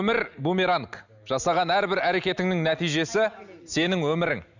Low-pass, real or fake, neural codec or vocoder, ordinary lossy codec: 7.2 kHz; real; none; none